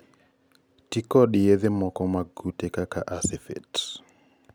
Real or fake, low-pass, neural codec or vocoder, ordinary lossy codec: real; none; none; none